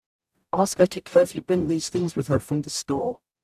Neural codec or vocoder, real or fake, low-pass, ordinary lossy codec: codec, 44.1 kHz, 0.9 kbps, DAC; fake; 14.4 kHz; AAC, 96 kbps